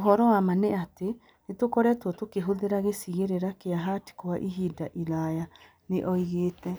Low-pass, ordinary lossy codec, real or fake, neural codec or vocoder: none; none; real; none